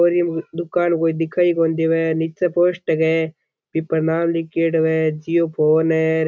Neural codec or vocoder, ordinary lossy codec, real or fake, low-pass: none; none; real; none